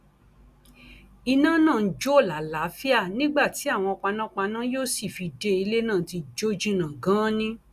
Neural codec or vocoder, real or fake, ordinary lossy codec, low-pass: none; real; Opus, 64 kbps; 14.4 kHz